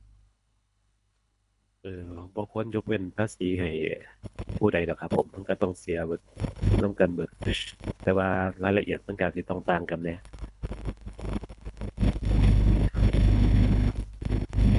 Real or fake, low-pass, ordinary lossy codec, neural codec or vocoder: fake; 10.8 kHz; none; codec, 24 kHz, 3 kbps, HILCodec